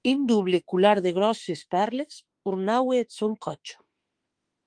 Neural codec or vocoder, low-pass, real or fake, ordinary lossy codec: autoencoder, 48 kHz, 32 numbers a frame, DAC-VAE, trained on Japanese speech; 9.9 kHz; fake; Opus, 24 kbps